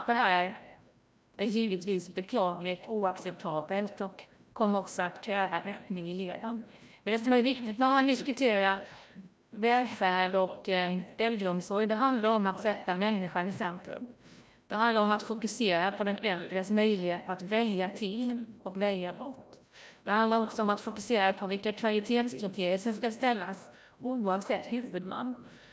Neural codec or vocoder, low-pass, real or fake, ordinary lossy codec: codec, 16 kHz, 0.5 kbps, FreqCodec, larger model; none; fake; none